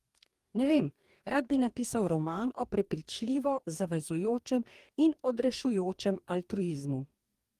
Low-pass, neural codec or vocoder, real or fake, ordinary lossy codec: 14.4 kHz; codec, 44.1 kHz, 2.6 kbps, DAC; fake; Opus, 24 kbps